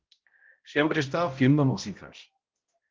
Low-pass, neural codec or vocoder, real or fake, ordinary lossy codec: 7.2 kHz; codec, 16 kHz, 0.5 kbps, X-Codec, HuBERT features, trained on balanced general audio; fake; Opus, 16 kbps